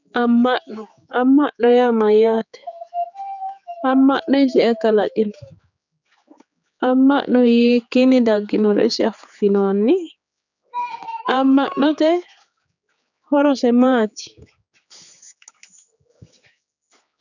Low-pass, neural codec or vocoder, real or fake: 7.2 kHz; codec, 16 kHz, 4 kbps, X-Codec, HuBERT features, trained on general audio; fake